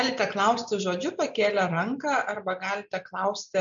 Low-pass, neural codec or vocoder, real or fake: 7.2 kHz; none; real